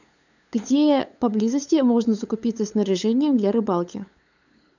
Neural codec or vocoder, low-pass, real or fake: codec, 16 kHz, 8 kbps, FunCodec, trained on LibriTTS, 25 frames a second; 7.2 kHz; fake